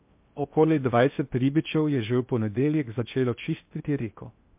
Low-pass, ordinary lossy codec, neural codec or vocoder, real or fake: 3.6 kHz; MP3, 32 kbps; codec, 16 kHz in and 24 kHz out, 0.6 kbps, FocalCodec, streaming, 2048 codes; fake